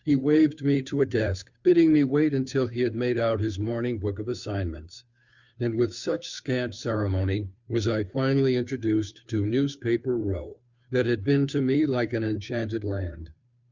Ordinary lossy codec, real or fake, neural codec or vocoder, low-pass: Opus, 64 kbps; fake; codec, 16 kHz, 2 kbps, FunCodec, trained on Chinese and English, 25 frames a second; 7.2 kHz